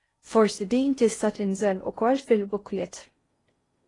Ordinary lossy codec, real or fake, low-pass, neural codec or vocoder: AAC, 32 kbps; fake; 10.8 kHz; codec, 16 kHz in and 24 kHz out, 0.6 kbps, FocalCodec, streaming, 4096 codes